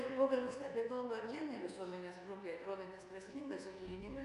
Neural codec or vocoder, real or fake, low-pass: codec, 24 kHz, 1.2 kbps, DualCodec; fake; 10.8 kHz